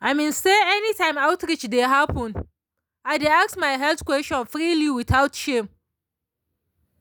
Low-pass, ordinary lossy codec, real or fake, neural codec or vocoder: none; none; real; none